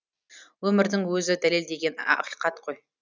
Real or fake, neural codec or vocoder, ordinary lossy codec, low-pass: real; none; none; none